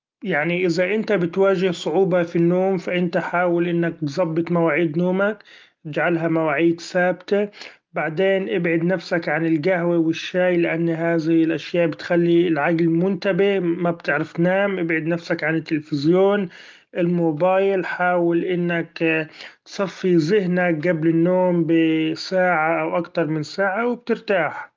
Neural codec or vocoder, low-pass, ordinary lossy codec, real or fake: none; 7.2 kHz; Opus, 32 kbps; real